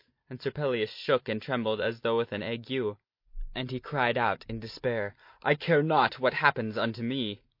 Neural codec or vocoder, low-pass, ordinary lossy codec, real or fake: none; 5.4 kHz; MP3, 32 kbps; real